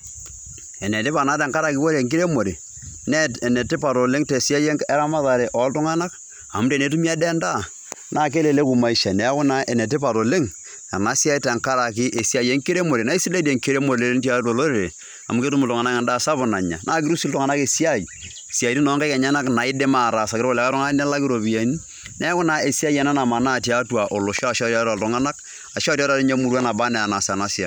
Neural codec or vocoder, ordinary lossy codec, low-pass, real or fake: none; none; none; real